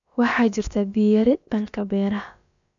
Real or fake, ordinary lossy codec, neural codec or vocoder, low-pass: fake; none; codec, 16 kHz, about 1 kbps, DyCAST, with the encoder's durations; 7.2 kHz